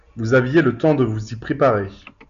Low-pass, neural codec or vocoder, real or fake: 7.2 kHz; none; real